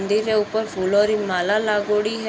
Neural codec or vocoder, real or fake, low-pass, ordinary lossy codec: none; real; none; none